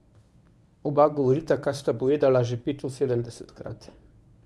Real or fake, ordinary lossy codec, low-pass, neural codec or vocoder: fake; none; none; codec, 24 kHz, 0.9 kbps, WavTokenizer, medium speech release version 1